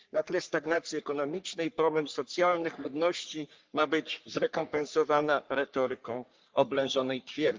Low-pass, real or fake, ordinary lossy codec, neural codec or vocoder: 7.2 kHz; fake; Opus, 24 kbps; codec, 44.1 kHz, 3.4 kbps, Pupu-Codec